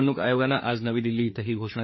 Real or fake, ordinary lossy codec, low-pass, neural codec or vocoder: fake; MP3, 24 kbps; 7.2 kHz; codec, 16 kHz, 4 kbps, FreqCodec, larger model